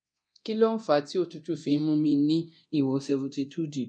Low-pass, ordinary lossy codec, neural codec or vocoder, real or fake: 9.9 kHz; none; codec, 24 kHz, 0.9 kbps, DualCodec; fake